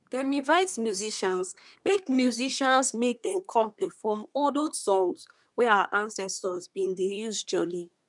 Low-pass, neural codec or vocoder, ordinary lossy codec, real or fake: 10.8 kHz; codec, 24 kHz, 1 kbps, SNAC; none; fake